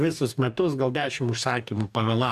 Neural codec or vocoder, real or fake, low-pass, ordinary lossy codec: codec, 44.1 kHz, 2.6 kbps, DAC; fake; 14.4 kHz; AAC, 96 kbps